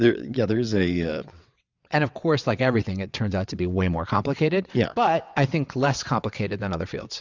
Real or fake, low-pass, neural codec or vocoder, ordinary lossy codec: fake; 7.2 kHz; vocoder, 22.05 kHz, 80 mel bands, WaveNeXt; Opus, 64 kbps